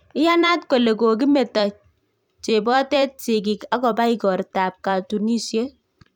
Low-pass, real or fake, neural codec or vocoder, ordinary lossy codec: 19.8 kHz; fake; vocoder, 44.1 kHz, 128 mel bands every 256 samples, BigVGAN v2; none